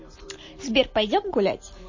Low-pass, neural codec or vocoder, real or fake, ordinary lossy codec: 7.2 kHz; none; real; MP3, 32 kbps